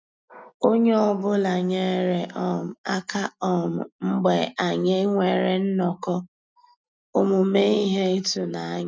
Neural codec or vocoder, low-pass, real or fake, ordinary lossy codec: none; none; real; none